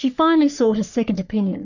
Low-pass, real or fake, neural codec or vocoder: 7.2 kHz; fake; codec, 44.1 kHz, 3.4 kbps, Pupu-Codec